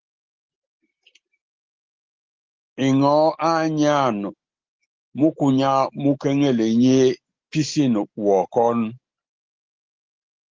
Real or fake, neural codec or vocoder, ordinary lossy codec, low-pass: real; none; Opus, 16 kbps; 7.2 kHz